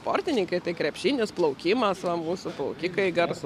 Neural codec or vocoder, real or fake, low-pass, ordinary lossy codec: none; real; 14.4 kHz; MP3, 96 kbps